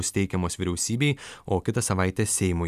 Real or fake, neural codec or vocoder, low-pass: real; none; 14.4 kHz